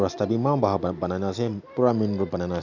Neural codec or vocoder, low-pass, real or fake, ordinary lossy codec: none; 7.2 kHz; real; none